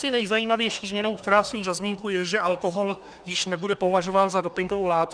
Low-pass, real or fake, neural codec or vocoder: 9.9 kHz; fake; codec, 24 kHz, 1 kbps, SNAC